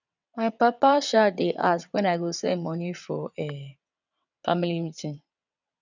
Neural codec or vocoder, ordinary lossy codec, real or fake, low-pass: vocoder, 22.05 kHz, 80 mel bands, WaveNeXt; none; fake; 7.2 kHz